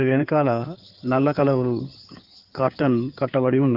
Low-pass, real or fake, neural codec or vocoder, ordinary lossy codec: 5.4 kHz; fake; codec, 16 kHz in and 24 kHz out, 2.2 kbps, FireRedTTS-2 codec; Opus, 32 kbps